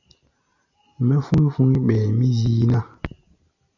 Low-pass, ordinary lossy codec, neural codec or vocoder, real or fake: 7.2 kHz; AAC, 48 kbps; none; real